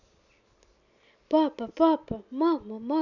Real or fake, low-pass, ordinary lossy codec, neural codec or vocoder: real; 7.2 kHz; none; none